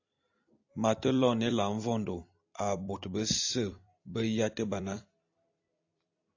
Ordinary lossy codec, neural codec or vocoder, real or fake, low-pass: AAC, 48 kbps; vocoder, 44.1 kHz, 128 mel bands every 256 samples, BigVGAN v2; fake; 7.2 kHz